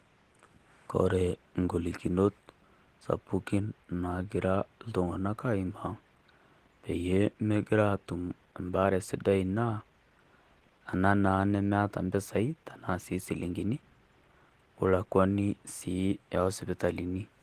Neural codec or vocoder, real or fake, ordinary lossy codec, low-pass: none; real; Opus, 16 kbps; 14.4 kHz